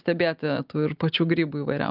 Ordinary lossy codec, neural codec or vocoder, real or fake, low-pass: Opus, 24 kbps; none; real; 5.4 kHz